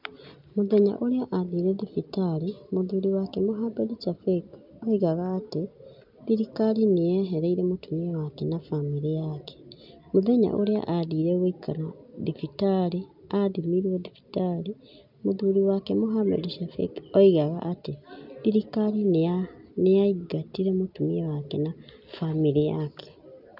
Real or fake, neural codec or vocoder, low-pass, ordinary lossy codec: real; none; 5.4 kHz; none